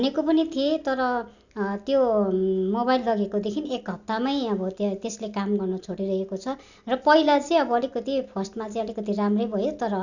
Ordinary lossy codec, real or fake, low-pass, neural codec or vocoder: none; real; 7.2 kHz; none